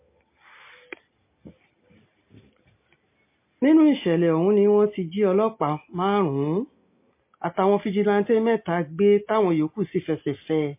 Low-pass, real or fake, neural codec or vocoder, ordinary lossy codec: 3.6 kHz; real; none; MP3, 24 kbps